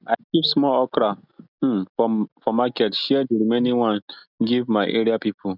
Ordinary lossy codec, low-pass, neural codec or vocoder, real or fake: none; 5.4 kHz; none; real